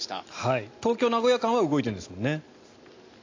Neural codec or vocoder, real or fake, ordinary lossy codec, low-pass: none; real; AAC, 48 kbps; 7.2 kHz